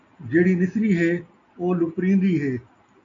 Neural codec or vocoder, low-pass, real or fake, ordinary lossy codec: none; 7.2 kHz; real; AAC, 48 kbps